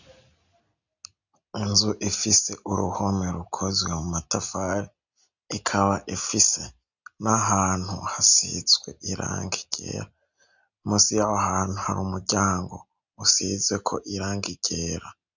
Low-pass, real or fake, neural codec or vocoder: 7.2 kHz; real; none